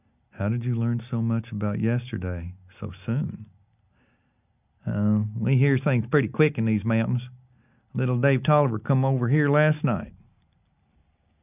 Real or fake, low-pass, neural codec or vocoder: real; 3.6 kHz; none